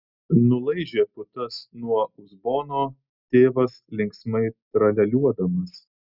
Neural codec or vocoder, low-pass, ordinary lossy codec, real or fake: none; 5.4 kHz; Opus, 64 kbps; real